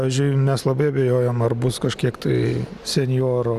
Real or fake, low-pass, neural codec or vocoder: real; 14.4 kHz; none